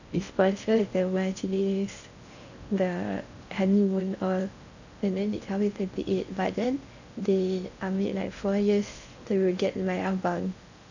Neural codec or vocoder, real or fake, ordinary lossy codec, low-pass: codec, 16 kHz in and 24 kHz out, 0.6 kbps, FocalCodec, streaming, 4096 codes; fake; none; 7.2 kHz